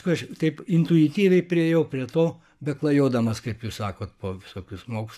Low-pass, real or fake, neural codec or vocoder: 14.4 kHz; fake; codec, 44.1 kHz, 7.8 kbps, Pupu-Codec